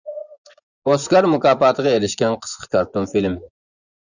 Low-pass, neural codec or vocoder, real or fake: 7.2 kHz; vocoder, 24 kHz, 100 mel bands, Vocos; fake